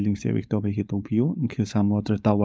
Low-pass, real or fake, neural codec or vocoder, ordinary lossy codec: none; fake; codec, 16 kHz, 4.8 kbps, FACodec; none